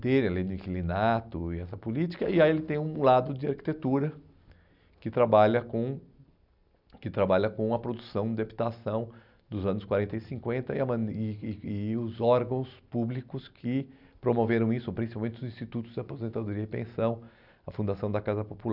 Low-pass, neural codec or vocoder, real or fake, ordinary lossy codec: 5.4 kHz; none; real; none